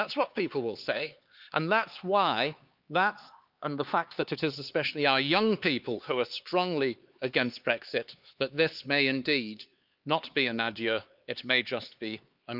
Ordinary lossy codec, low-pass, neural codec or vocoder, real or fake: Opus, 24 kbps; 5.4 kHz; codec, 16 kHz, 4 kbps, X-Codec, HuBERT features, trained on LibriSpeech; fake